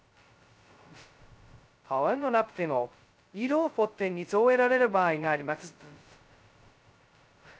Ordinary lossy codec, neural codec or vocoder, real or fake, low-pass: none; codec, 16 kHz, 0.2 kbps, FocalCodec; fake; none